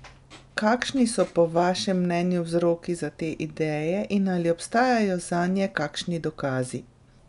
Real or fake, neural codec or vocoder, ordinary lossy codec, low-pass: real; none; none; 10.8 kHz